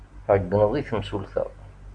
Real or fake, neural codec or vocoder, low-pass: real; none; 9.9 kHz